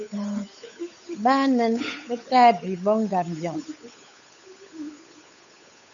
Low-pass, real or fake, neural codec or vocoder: 7.2 kHz; fake; codec, 16 kHz, 8 kbps, FunCodec, trained on Chinese and English, 25 frames a second